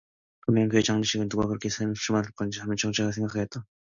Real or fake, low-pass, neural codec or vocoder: real; 7.2 kHz; none